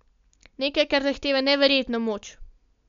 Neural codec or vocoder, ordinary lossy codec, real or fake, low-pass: none; MP3, 64 kbps; real; 7.2 kHz